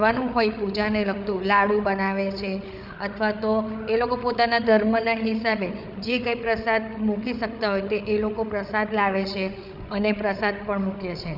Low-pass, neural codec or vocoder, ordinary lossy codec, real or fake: 5.4 kHz; codec, 16 kHz, 8 kbps, FreqCodec, larger model; none; fake